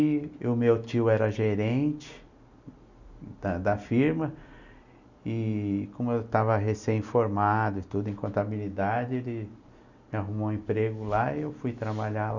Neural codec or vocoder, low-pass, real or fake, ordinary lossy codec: none; 7.2 kHz; real; none